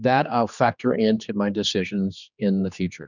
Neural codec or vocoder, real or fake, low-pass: codec, 16 kHz, 2 kbps, X-Codec, HuBERT features, trained on general audio; fake; 7.2 kHz